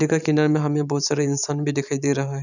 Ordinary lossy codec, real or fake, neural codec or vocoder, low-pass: none; fake; vocoder, 44.1 kHz, 128 mel bands every 512 samples, BigVGAN v2; 7.2 kHz